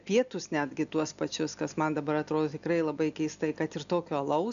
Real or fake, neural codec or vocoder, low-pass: real; none; 7.2 kHz